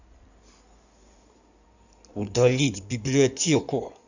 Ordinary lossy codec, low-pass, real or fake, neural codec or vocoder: none; 7.2 kHz; fake; codec, 16 kHz in and 24 kHz out, 2.2 kbps, FireRedTTS-2 codec